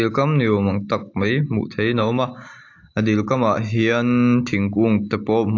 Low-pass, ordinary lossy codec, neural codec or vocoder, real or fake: 7.2 kHz; AAC, 48 kbps; none; real